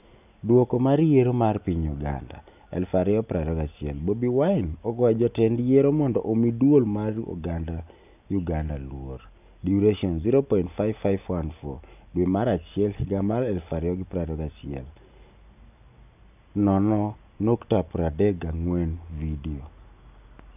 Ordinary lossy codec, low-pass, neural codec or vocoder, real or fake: none; 3.6 kHz; none; real